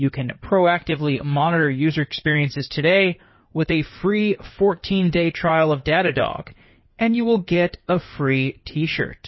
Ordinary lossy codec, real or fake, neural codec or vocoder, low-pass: MP3, 24 kbps; fake; codec, 16 kHz in and 24 kHz out, 2.2 kbps, FireRedTTS-2 codec; 7.2 kHz